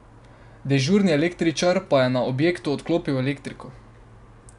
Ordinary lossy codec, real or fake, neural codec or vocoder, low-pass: none; real; none; 10.8 kHz